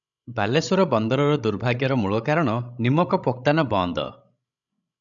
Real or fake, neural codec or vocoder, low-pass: fake; codec, 16 kHz, 16 kbps, FreqCodec, larger model; 7.2 kHz